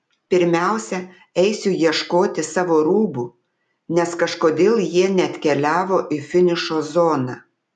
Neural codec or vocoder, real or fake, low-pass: none; real; 10.8 kHz